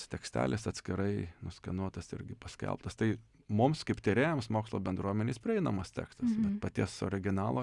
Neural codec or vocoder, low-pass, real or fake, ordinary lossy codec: none; 10.8 kHz; real; Opus, 64 kbps